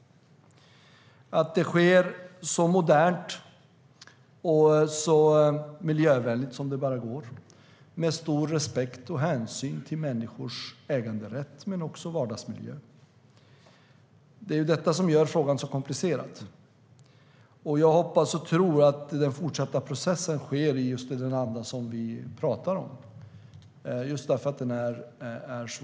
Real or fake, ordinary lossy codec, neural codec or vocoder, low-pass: real; none; none; none